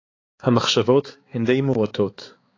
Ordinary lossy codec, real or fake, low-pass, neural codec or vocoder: AAC, 32 kbps; fake; 7.2 kHz; codec, 16 kHz, 4 kbps, X-Codec, HuBERT features, trained on balanced general audio